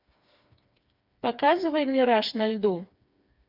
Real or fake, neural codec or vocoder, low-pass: fake; codec, 16 kHz, 4 kbps, FreqCodec, smaller model; 5.4 kHz